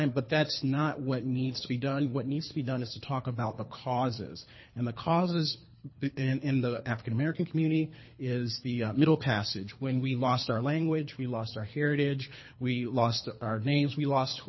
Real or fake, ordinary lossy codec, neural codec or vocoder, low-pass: fake; MP3, 24 kbps; codec, 24 kHz, 3 kbps, HILCodec; 7.2 kHz